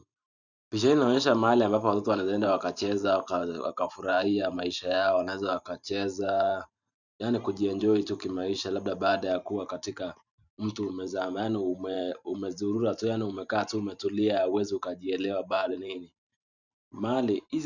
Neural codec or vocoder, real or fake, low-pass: vocoder, 44.1 kHz, 128 mel bands every 512 samples, BigVGAN v2; fake; 7.2 kHz